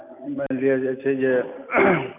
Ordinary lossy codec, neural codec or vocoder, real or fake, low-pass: none; none; real; 3.6 kHz